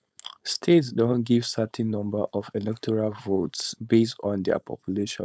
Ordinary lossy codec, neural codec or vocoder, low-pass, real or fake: none; codec, 16 kHz, 4.8 kbps, FACodec; none; fake